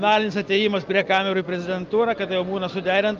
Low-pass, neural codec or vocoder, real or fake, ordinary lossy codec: 7.2 kHz; none; real; Opus, 32 kbps